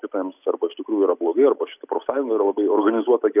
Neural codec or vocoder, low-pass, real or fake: none; 3.6 kHz; real